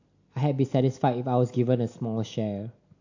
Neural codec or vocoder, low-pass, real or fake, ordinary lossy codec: none; 7.2 kHz; real; AAC, 48 kbps